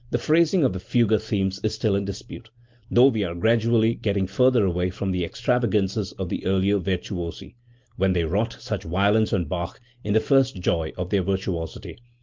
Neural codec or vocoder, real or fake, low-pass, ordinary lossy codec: none; real; 7.2 kHz; Opus, 32 kbps